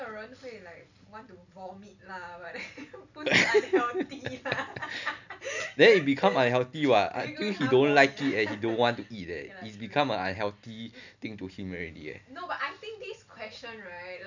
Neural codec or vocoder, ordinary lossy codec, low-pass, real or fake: none; none; 7.2 kHz; real